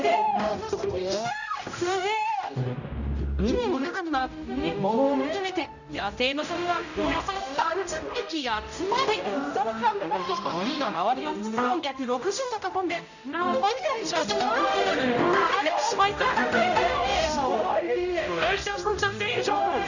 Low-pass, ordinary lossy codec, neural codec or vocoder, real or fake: 7.2 kHz; none; codec, 16 kHz, 0.5 kbps, X-Codec, HuBERT features, trained on general audio; fake